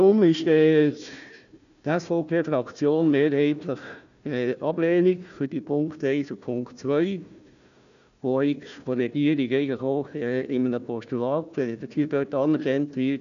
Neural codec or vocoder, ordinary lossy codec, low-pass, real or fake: codec, 16 kHz, 1 kbps, FunCodec, trained on Chinese and English, 50 frames a second; none; 7.2 kHz; fake